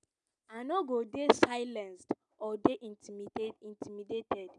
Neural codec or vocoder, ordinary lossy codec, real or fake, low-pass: none; none; real; 10.8 kHz